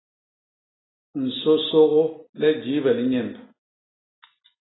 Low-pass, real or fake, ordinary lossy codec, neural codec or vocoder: 7.2 kHz; real; AAC, 16 kbps; none